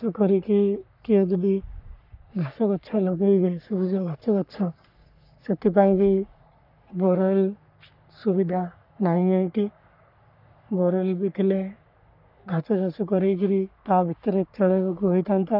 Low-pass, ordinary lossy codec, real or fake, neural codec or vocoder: 5.4 kHz; none; fake; codec, 44.1 kHz, 3.4 kbps, Pupu-Codec